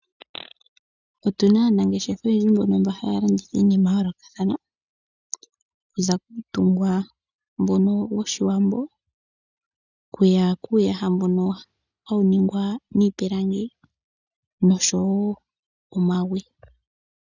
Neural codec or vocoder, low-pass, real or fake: none; 7.2 kHz; real